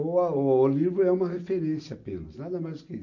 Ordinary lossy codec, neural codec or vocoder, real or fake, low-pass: none; none; real; 7.2 kHz